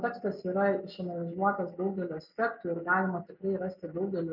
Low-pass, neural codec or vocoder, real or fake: 5.4 kHz; none; real